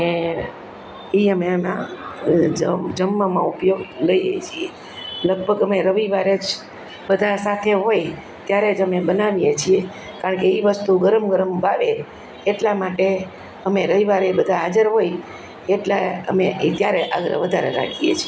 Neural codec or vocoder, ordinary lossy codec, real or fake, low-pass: none; none; real; none